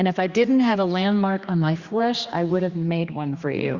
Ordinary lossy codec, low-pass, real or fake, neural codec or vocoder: Opus, 64 kbps; 7.2 kHz; fake; codec, 16 kHz, 2 kbps, X-Codec, HuBERT features, trained on general audio